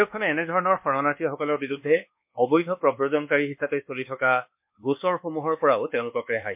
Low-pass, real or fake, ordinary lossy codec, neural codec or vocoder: 3.6 kHz; fake; MP3, 32 kbps; codec, 24 kHz, 1.2 kbps, DualCodec